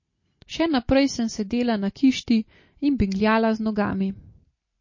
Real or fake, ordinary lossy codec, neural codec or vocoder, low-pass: real; MP3, 32 kbps; none; 7.2 kHz